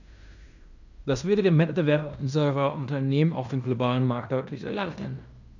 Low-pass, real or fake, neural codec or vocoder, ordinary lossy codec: 7.2 kHz; fake; codec, 16 kHz in and 24 kHz out, 0.9 kbps, LongCat-Audio-Codec, fine tuned four codebook decoder; none